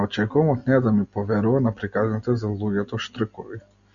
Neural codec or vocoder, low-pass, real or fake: none; 7.2 kHz; real